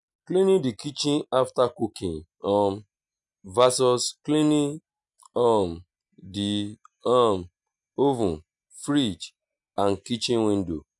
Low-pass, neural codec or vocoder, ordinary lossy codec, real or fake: 10.8 kHz; none; none; real